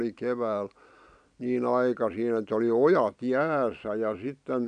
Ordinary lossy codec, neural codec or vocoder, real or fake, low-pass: none; none; real; 9.9 kHz